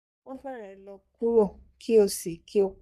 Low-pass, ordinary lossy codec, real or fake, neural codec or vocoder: 14.4 kHz; none; fake; codec, 44.1 kHz, 3.4 kbps, Pupu-Codec